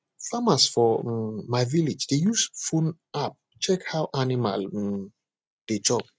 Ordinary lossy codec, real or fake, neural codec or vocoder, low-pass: none; real; none; none